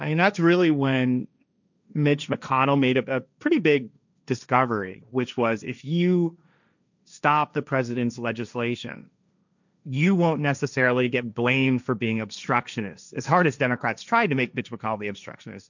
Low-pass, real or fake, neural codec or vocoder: 7.2 kHz; fake; codec, 16 kHz, 1.1 kbps, Voila-Tokenizer